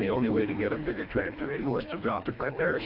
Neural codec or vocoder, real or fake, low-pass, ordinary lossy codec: codec, 24 kHz, 1.5 kbps, HILCodec; fake; 5.4 kHz; AAC, 48 kbps